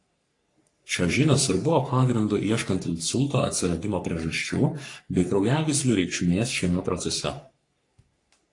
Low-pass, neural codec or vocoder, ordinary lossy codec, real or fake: 10.8 kHz; codec, 44.1 kHz, 3.4 kbps, Pupu-Codec; AAC, 48 kbps; fake